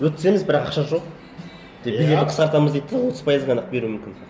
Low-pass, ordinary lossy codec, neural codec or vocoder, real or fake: none; none; none; real